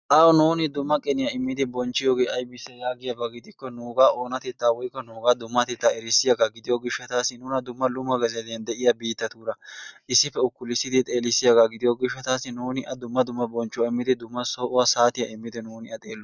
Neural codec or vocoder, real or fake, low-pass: none; real; 7.2 kHz